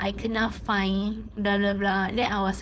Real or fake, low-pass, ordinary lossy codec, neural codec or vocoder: fake; none; none; codec, 16 kHz, 4.8 kbps, FACodec